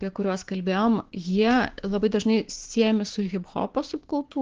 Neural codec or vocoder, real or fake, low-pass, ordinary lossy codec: codec, 16 kHz, 6 kbps, DAC; fake; 7.2 kHz; Opus, 16 kbps